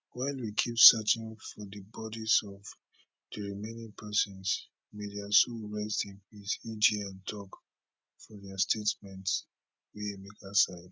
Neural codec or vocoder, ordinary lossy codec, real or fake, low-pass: none; none; real; none